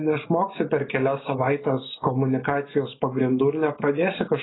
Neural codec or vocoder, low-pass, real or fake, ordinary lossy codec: none; 7.2 kHz; real; AAC, 16 kbps